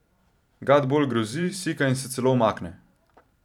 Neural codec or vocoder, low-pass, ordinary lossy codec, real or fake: vocoder, 44.1 kHz, 128 mel bands every 512 samples, BigVGAN v2; 19.8 kHz; none; fake